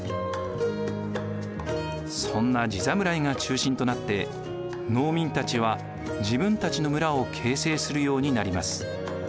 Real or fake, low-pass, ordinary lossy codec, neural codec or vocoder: real; none; none; none